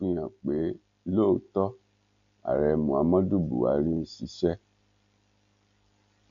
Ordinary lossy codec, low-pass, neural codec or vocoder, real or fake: none; 7.2 kHz; none; real